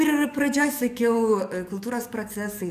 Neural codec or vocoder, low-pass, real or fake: codec, 44.1 kHz, 7.8 kbps, DAC; 14.4 kHz; fake